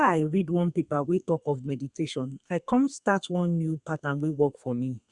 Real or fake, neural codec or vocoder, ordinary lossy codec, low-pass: fake; codec, 32 kHz, 1.9 kbps, SNAC; Opus, 64 kbps; 10.8 kHz